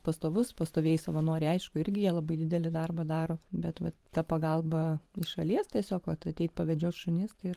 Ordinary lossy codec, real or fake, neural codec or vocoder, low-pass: Opus, 32 kbps; fake; vocoder, 44.1 kHz, 128 mel bands every 512 samples, BigVGAN v2; 14.4 kHz